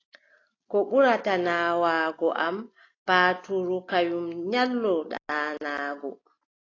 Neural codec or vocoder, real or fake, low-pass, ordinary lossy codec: none; real; 7.2 kHz; AAC, 32 kbps